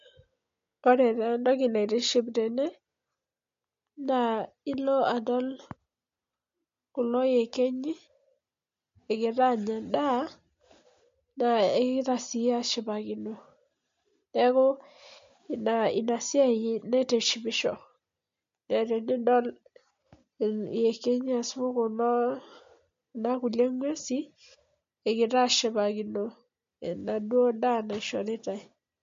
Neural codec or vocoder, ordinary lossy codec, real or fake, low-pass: none; MP3, 48 kbps; real; 7.2 kHz